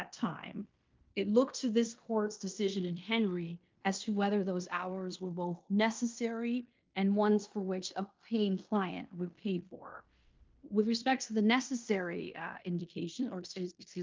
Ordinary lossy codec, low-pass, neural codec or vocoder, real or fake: Opus, 24 kbps; 7.2 kHz; codec, 16 kHz in and 24 kHz out, 0.9 kbps, LongCat-Audio-Codec, fine tuned four codebook decoder; fake